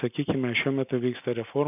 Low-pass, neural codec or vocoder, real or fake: 3.6 kHz; none; real